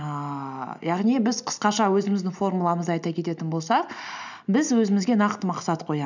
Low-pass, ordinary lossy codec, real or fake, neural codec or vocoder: 7.2 kHz; none; real; none